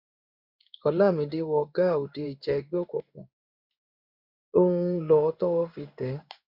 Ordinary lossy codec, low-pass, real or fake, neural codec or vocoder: none; 5.4 kHz; fake; codec, 16 kHz in and 24 kHz out, 1 kbps, XY-Tokenizer